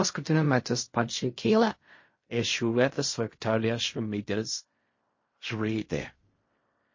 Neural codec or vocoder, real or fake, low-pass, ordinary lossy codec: codec, 16 kHz in and 24 kHz out, 0.4 kbps, LongCat-Audio-Codec, fine tuned four codebook decoder; fake; 7.2 kHz; MP3, 32 kbps